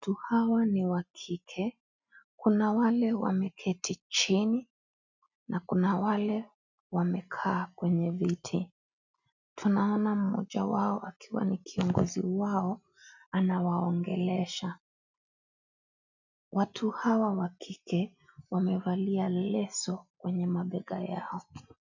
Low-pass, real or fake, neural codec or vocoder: 7.2 kHz; real; none